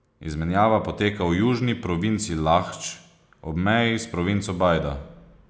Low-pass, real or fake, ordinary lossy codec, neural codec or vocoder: none; real; none; none